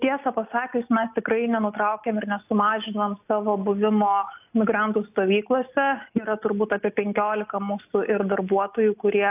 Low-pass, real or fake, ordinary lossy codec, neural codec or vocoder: 3.6 kHz; real; AAC, 32 kbps; none